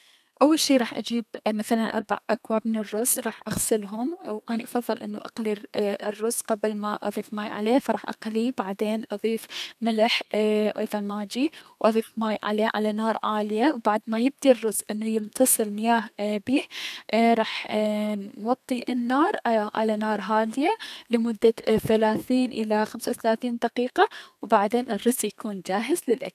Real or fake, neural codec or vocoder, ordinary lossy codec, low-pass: fake; codec, 32 kHz, 1.9 kbps, SNAC; none; 14.4 kHz